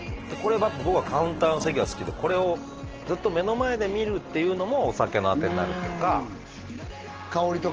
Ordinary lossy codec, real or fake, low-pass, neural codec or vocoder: Opus, 16 kbps; real; 7.2 kHz; none